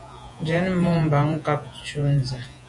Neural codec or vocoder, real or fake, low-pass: vocoder, 48 kHz, 128 mel bands, Vocos; fake; 10.8 kHz